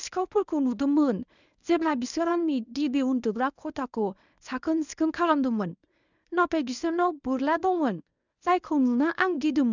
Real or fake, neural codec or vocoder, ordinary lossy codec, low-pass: fake; codec, 24 kHz, 0.9 kbps, WavTokenizer, medium speech release version 1; none; 7.2 kHz